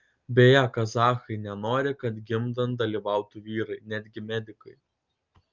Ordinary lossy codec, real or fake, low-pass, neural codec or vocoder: Opus, 24 kbps; real; 7.2 kHz; none